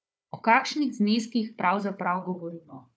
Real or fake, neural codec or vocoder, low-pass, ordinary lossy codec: fake; codec, 16 kHz, 4 kbps, FunCodec, trained on Chinese and English, 50 frames a second; none; none